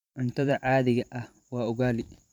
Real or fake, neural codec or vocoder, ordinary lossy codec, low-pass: fake; vocoder, 44.1 kHz, 128 mel bands every 512 samples, BigVGAN v2; none; 19.8 kHz